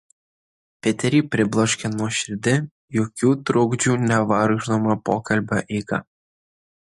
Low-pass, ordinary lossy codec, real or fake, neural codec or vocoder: 14.4 kHz; MP3, 48 kbps; real; none